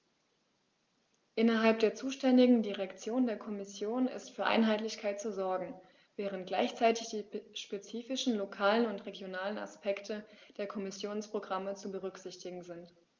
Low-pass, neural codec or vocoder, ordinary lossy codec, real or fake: 7.2 kHz; none; Opus, 32 kbps; real